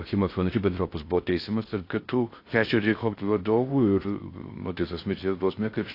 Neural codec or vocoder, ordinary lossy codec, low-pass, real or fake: codec, 16 kHz in and 24 kHz out, 0.6 kbps, FocalCodec, streaming, 4096 codes; AAC, 32 kbps; 5.4 kHz; fake